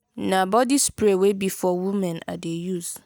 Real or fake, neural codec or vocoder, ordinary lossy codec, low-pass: real; none; none; none